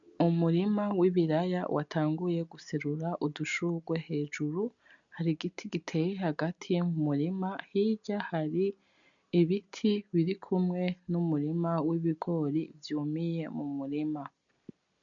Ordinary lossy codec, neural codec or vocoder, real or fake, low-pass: MP3, 96 kbps; none; real; 7.2 kHz